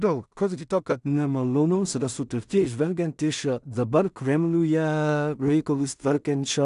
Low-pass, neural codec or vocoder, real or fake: 10.8 kHz; codec, 16 kHz in and 24 kHz out, 0.4 kbps, LongCat-Audio-Codec, two codebook decoder; fake